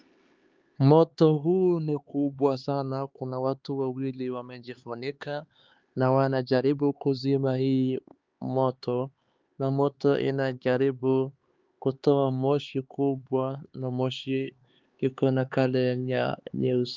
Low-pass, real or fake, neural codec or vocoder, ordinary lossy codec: 7.2 kHz; fake; codec, 16 kHz, 4 kbps, X-Codec, HuBERT features, trained on LibriSpeech; Opus, 32 kbps